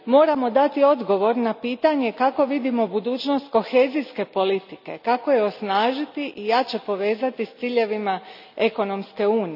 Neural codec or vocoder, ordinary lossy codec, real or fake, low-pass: none; none; real; 5.4 kHz